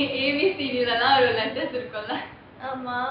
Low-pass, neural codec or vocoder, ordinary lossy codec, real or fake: 5.4 kHz; none; none; real